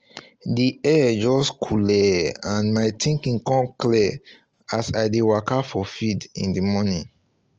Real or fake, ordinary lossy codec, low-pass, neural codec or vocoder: real; Opus, 24 kbps; 7.2 kHz; none